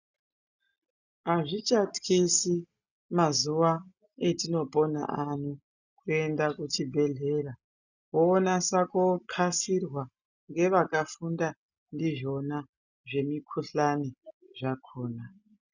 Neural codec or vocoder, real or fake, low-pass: none; real; 7.2 kHz